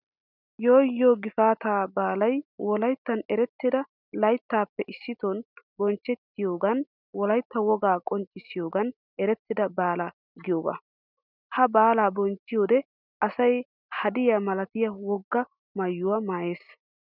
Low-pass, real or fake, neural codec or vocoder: 5.4 kHz; real; none